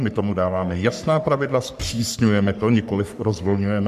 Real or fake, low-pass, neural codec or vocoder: fake; 14.4 kHz; codec, 44.1 kHz, 3.4 kbps, Pupu-Codec